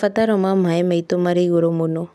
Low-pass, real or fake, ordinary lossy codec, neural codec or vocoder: none; real; none; none